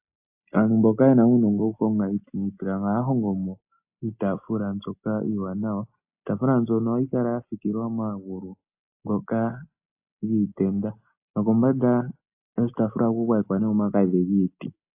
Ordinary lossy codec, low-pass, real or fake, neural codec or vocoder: AAC, 32 kbps; 3.6 kHz; real; none